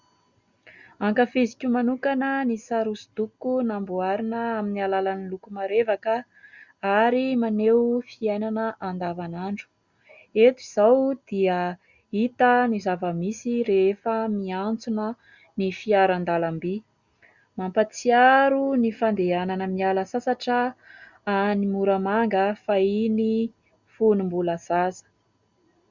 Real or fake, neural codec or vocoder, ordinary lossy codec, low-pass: real; none; Opus, 64 kbps; 7.2 kHz